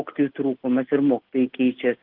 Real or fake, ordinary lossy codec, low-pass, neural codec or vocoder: real; Opus, 16 kbps; 5.4 kHz; none